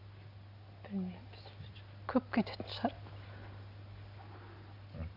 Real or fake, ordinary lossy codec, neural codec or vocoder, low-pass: real; none; none; 5.4 kHz